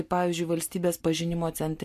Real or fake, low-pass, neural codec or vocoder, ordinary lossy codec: real; 14.4 kHz; none; MP3, 64 kbps